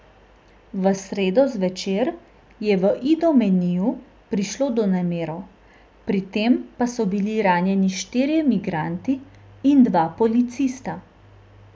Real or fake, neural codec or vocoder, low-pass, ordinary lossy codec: real; none; none; none